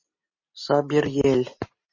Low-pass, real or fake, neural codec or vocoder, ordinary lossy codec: 7.2 kHz; real; none; MP3, 32 kbps